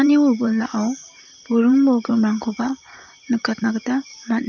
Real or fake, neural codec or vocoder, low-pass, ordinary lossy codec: fake; vocoder, 44.1 kHz, 128 mel bands, Pupu-Vocoder; 7.2 kHz; none